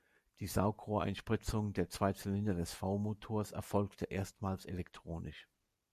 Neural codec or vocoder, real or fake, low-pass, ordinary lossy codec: none; real; 14.4 kHz; MP3, 96 kbps